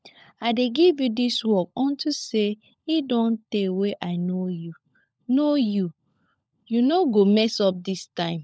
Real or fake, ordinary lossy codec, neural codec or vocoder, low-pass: fake; none; codec, 16 kHz, 16 kbps, FunCodec, trained on LibriTTS, 50 frames a second; none